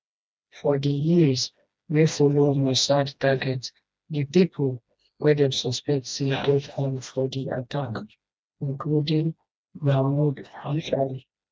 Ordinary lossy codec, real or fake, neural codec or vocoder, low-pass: none; fake; codec, 16 kHz, 1 kbps, FreqCodec, smaller model; none